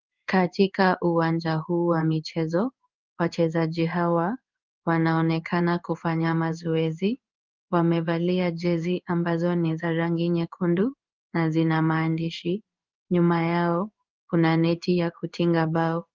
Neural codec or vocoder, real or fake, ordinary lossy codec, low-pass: codec, 16 kHz in and 24 kHz out, 1 kbps, XY-Tokenizer; fake; Opus, 24 kbps; 7.2 kHz